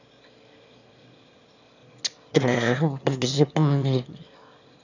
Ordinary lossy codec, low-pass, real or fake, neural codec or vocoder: none; 7.2 kHz; fake; autoencoder, 22.05 kHz, a latent of 192 numbers a frame, VITS, trained on one speaker